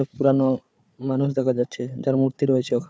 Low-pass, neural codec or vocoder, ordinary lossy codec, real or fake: none; codec, 16 kHz, 4 kbps, FunCodec, trained on Chinese and English, 50 frames a second; none; fake